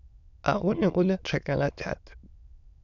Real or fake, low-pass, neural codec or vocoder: fake; 7.2 kHz; autoencoder, 22.05 kHz, a latent of 192 numbers a frame, VITS, trained on many speakers